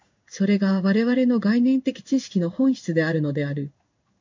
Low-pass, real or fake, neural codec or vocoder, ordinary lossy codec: 7.2 kHz; fake; codec, 16 kHz in and 24 kHz out, 1 kbps, XY-Tokenizer; MP3, 64 kbps